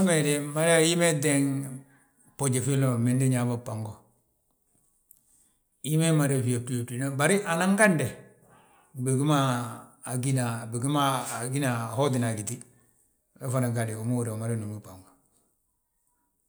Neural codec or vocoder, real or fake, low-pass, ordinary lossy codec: none; real; none; none